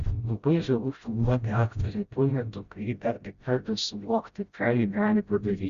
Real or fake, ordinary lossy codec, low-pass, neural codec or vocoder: fake; Opus, 64 kbps; 7.2 kHz; codec, 16 kHz, 0.5 kbps, FreqCodec, smaller model